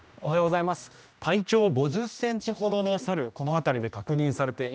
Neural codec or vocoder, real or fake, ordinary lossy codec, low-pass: codec, 16 kHz, 1 kbps, X-Codec, HuBERT features, trained on general audio; fake; none; none